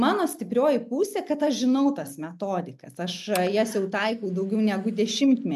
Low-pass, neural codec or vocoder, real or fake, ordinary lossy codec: 14.4 kHz; none; real; MP3, 96 kbps